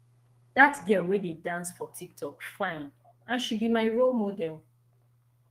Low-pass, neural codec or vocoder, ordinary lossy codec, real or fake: 14.4 kHz; codec, 32 kHz, 1.9 kbps, SNAC; Opus, 32 kbps; fake